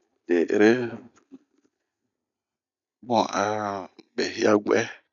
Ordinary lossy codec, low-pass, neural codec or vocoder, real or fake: none; 7.2 kHz; none; real